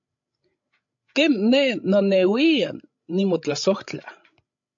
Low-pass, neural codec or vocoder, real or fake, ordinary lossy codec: 7.2 kHz; codec, 16 kHz, 16 kbps, FreqCodec, larger model; fake; AAC, 64 kbps